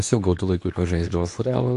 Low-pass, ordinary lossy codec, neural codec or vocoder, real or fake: 10.8 kHz; AAC, 64 kbps; codec, 24 kHz, 0.9 kbps, WavTokenizer, medium speech release version 2; fake